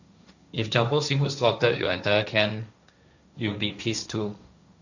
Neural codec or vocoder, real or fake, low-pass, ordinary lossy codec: codec, 16 kHz, 1.1 kbps, Voila-Tokenizer; fake; 7.2 kHz; none